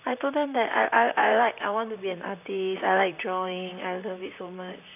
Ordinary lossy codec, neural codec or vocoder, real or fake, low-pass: none; vocoder, 44.1 kHz, 128 mel bands, Pupu-Vocoder; fake; 3.6 kHz